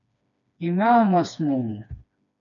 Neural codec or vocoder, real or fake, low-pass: codec, 16 kHz, 2 kbps, FreqCodec, smaller model; fake; 7.2 kHz